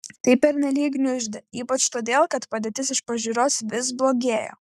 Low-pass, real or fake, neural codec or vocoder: 14.4 kHz; fake; codec, 44.1 kHz, 7.8 kbps, Pupu-Codec